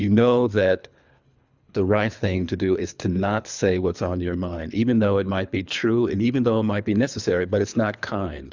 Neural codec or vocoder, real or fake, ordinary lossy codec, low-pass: codec, 24 kHz, 3 kbps, HILCodec; fake; Opus, 64 kbps; 7.2 kHz